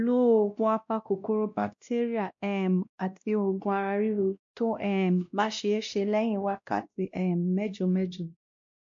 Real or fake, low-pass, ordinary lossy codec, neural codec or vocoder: fake; 7.2 kHz; MP3, 48 kbps; codec, 16 kHz, 1 kbps, X-Codec, WavLM features, trained on Multilingual LibriSpeech